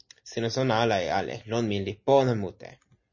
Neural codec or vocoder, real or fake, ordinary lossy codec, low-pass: none; real; MP3, 32 kbps; 7.2 kHz